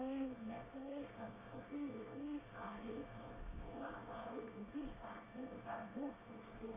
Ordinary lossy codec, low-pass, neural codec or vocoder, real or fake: AAC, 24 kbps; 3.6 kHz; codec, 24 kHz, 1 kbps, SNAC; fake